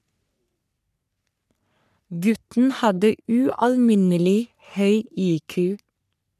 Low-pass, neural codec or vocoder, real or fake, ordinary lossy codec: 14.4 kHz; codec, 44.1 kHz, 3.4 kbps, Pupu-Codec; fake; none